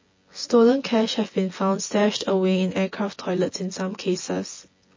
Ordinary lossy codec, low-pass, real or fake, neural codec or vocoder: MP3, 32 kbps; 7.2 kHz; fake; vocoder, 24 kHz, 100 mel bands, Vocos